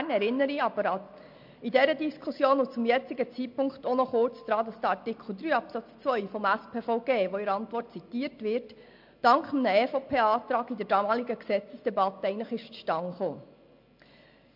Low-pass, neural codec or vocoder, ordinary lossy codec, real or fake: 5.4 kHz; none; MP3, 48 kbps; real